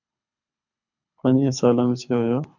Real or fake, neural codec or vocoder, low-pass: fake; codec, 24 kHz, 6 kbps, HILCodec; 7.2 kHz